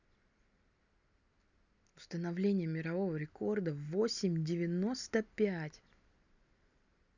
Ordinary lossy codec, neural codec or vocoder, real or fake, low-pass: none; none; real; 7.2 kHz